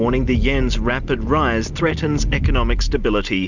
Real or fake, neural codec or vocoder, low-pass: real; none; 7.2 kHz